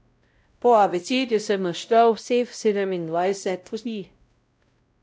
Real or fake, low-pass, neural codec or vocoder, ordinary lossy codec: fake; none; codec, 16 kHz, 0.5 kbps, X-Codec, WavLM features, trained on Multilingual LibriSpeech; none